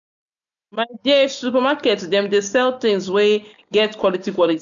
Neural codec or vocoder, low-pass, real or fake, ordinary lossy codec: none; 7.2 kHz; real; none